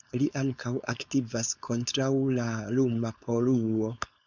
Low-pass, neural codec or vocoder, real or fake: 7.2 kHz; codec, 16 kHz, 4.8 kbps, FACodec; fake